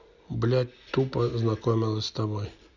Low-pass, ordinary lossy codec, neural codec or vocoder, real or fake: 7.2 kHz; none; none; real